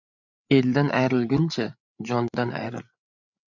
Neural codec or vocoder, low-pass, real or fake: codec, 16 kHz, 8 kbps, FreqCodec, larger model; 7.2 kHz; fake